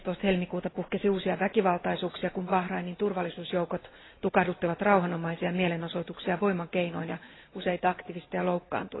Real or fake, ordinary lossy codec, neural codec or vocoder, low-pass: real; AAC, 16 kbps; none; 7.2 kHz